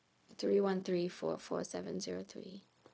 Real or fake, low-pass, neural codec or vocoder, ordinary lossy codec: fake; none; codec, 16 kHz, 0.4 kbps, LongCat-Audio-Codec; none